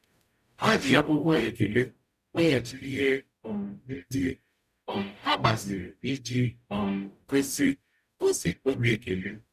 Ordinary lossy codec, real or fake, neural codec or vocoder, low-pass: none; fake; codec, 44.1 kHz, 0.9 kbps, DAC; 14.4 kHz